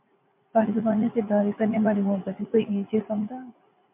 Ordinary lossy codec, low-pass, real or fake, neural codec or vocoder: MP3, 32 kbps; 3.6 kHz; fake; vocoder, 44.1 kHz, 80 mel bands, Vocos